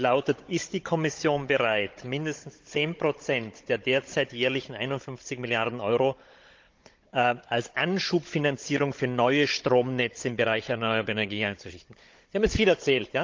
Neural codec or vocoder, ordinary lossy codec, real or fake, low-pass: codec, 16 kHz, 16 kbps, FunCodec, trained on Chinese and English, 50 frames a second; Opus, 24 kbps; fake; 7.2 kHz